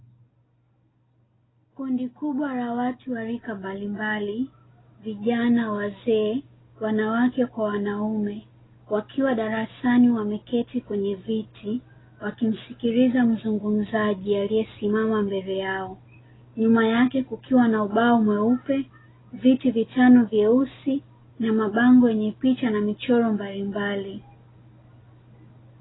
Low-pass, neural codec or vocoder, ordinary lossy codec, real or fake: 7.2 kHz; none; AAC, 16 kbps; real